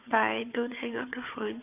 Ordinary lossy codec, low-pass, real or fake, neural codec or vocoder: none; 3.6 kHz; fake; codec, 16 kHz, 4 kbps, FunCodec, trained on Chinese and English, 50 frames a second